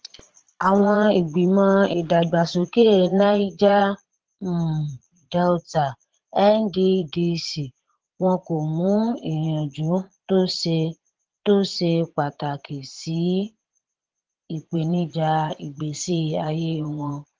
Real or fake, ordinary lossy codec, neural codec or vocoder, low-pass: fake; Opus, 16 kbps; vocoder, 22.05 kHz, 80 mel bands, Vocos; 7.2 kHz